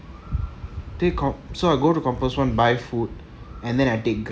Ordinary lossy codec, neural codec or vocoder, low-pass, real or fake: none; none; none; real